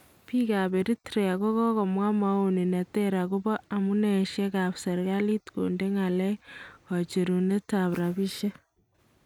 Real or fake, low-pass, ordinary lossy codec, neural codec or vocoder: real; 19.8 kHz; none; none